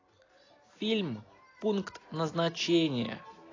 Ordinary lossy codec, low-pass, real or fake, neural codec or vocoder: AAC, 32 kbps; 7.2 kHz; real; none